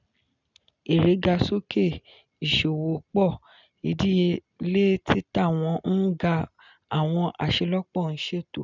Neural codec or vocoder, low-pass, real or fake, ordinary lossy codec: none; 7.2 kHz; real; none